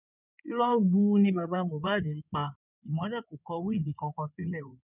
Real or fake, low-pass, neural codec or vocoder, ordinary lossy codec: fake; 3.6 kHz; codec, 16 kHz in and 24 kHz out, 2.2 kbps, FireRedTTS-2 codec; none